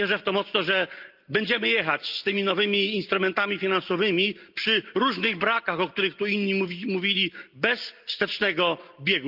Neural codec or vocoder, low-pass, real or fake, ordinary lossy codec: none; 5.4 kHz; real; Opus, 24 kbps